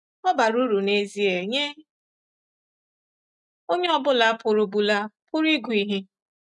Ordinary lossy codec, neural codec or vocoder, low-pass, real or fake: none; none; 10.8 kHz; real